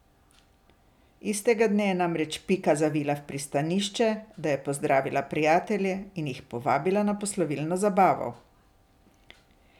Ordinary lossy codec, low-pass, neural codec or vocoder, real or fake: none; 19.8 kHz; none; real